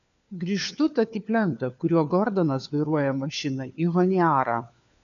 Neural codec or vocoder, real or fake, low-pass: codec, 16 kHz, 4 kbps, FunCodec, trained on LibriTTS, 50 frames a second; fake; 7.2 kHz